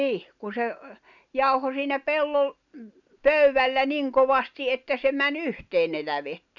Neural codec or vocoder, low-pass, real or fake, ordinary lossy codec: none; 7.2 kHz; real; none